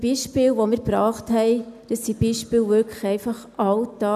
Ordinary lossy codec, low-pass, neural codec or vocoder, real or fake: none; 14.4 kHz; none; real